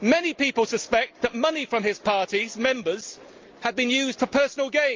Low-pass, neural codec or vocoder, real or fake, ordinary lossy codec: 7.2 kHz; none; real; Opus, 24 kbps